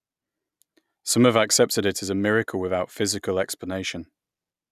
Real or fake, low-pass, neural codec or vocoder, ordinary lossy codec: real; 14.4 kHz; none; none